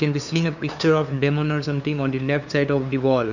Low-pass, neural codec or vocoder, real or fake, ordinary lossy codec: 7.2 kHz; codec, 16 kHz, 2 kbps, FunCodec, trained on LibriTTS, 25 frames a second; fake; none